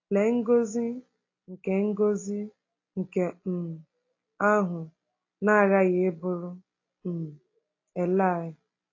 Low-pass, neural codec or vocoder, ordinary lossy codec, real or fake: 7.2 kHz; none; AAC, 32 kbps; real